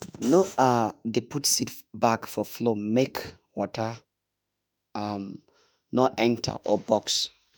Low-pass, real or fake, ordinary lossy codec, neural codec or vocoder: none; fake; none; autoencoder, 48 kHz, 32 numbers a frame, DAC-VAE, trained on Japanese speech